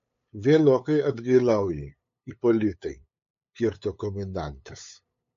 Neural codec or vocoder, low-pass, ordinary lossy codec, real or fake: codec, 16 kHz, 8 kbps, FunCodec, trained on LibriTTS, 25 frames a second; 7.2 kHz; MP3, 48 kbps; fake